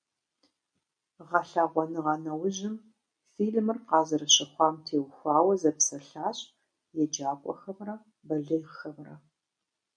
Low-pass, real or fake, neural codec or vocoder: 9.9 kHz; real; none